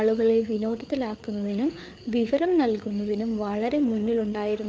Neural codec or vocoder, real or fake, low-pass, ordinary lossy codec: codec, 16 kHz, 4 kbps, FreqCodec, larger model; fake; none; none